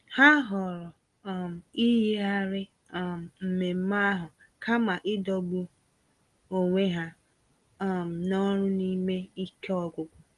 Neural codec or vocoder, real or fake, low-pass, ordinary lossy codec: none; real; 10.8 kHz; Opus, 24 kbps